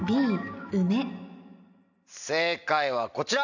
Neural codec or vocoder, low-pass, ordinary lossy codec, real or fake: none; 7.2 kHz; none; real